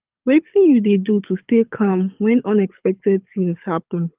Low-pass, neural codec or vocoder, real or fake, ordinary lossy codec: 3.6 kHz; codec, 24 kHz, 6 kbps, HILCodec; fake; Opus, 24 kbps